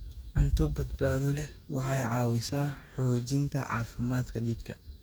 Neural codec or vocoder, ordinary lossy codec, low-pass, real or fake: codec, 44.1 kHz, 2.6 kbps, DAC; none; none; fake